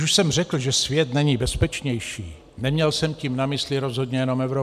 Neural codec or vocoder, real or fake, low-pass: none; real; 14.4 kHz